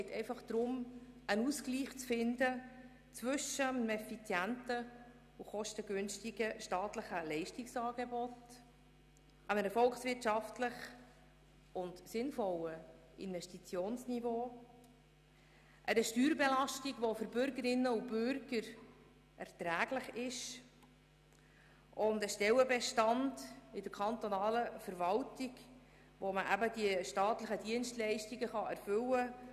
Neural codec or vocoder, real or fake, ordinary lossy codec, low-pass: none; real; none; 14.4 kHz